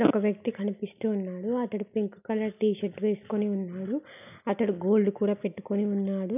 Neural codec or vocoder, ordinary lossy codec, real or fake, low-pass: none; none; real; 3.6 kHz